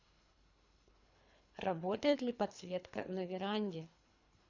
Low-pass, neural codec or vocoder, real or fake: 7.2 kHz; codec, 24 kHz, 3 kbps, HILCodec; fake